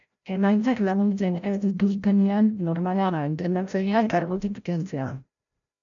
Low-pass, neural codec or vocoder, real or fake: 7.2 kHz; codec, 16 kHz, 0.5 kbps, FreqCodec, larger model; fake